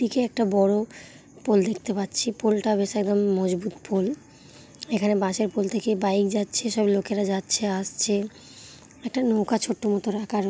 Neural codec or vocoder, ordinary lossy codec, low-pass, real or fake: none; none; none; real